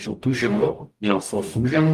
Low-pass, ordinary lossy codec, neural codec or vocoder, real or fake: 14.4 kHz; Opus, 32 kbps; codec, 44.1 kHz, 0.9 kbps, DAC; fake